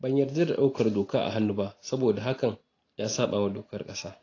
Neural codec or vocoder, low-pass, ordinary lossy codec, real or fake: none; 7.2 kHz; AAC, 32 kbps; real